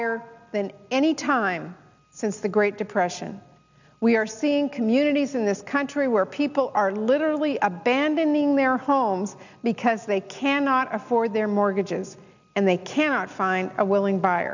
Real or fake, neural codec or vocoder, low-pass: real; none; 7.2 kHz